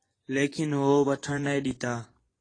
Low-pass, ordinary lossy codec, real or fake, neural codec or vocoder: 9.9 kHz; AAC, 32 kbps; fake; vocoder, 24 kHz, 100 mel bands, Vocos